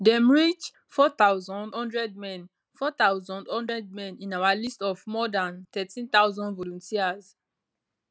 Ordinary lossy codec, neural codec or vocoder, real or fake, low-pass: none; none; real; none